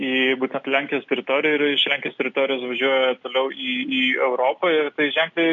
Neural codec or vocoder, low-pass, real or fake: none; 7.2 kHz; real